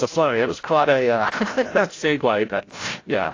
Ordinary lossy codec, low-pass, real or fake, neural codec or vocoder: AAC, 32 kbps; 7.2 kHz; fake; codec, 16 kHz, 0.5 kbps, FreqCodec, larger model